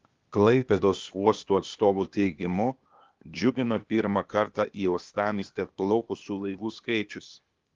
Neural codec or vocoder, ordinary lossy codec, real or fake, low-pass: codec, 16 kHz, 0.8 kbps, ZipCodec; Opus, 32 kbps; fake; 7.2 kHz